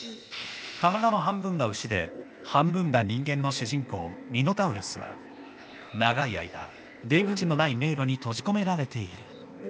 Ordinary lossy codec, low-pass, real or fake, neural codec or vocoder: none; none; fake; codec, 16 kHz, 0.8 kbps, ZipCodec